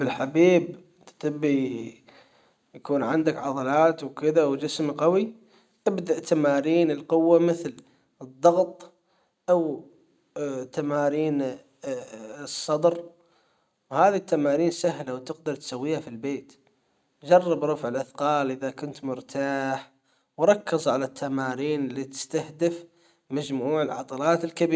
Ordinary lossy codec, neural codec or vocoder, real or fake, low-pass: none; none; real; none